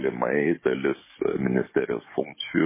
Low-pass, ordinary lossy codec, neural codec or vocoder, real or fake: 3.6 kHz; MP3, 16 kbps; codec, 44.1 kHz, 7.8 kbps, DAC; fake